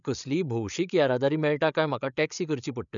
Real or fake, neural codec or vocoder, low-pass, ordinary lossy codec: fake; codec, 16 kHz, 8 kbps, FreqCodec, larger model; 7.2 kHz; none